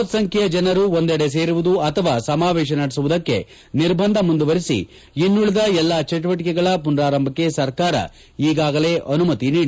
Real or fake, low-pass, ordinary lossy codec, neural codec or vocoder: real; none; none; none